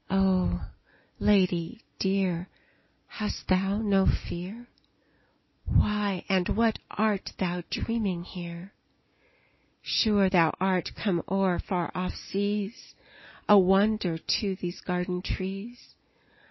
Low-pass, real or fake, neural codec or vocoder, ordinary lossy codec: 7.2 kHz; real; none; MP3, 24 kbps